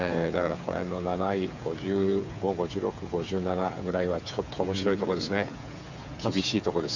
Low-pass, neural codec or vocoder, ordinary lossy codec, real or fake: 7.2 kHz; codec, 16 kHz, 8 kbps, FreqCodec, smaller model; none; fake